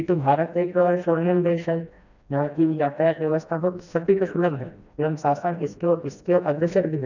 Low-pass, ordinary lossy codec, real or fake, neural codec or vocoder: 7.2 kHz; none; fake; codec, 16 kHz, 1 kbps, FreqCodec, smaller model